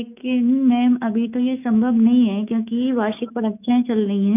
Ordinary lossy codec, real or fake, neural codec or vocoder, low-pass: none; fake; autoencoder, 48 kHz, 128 numbers a frame, DAC-VAE, trained on Japanese speech; 3.6 kHz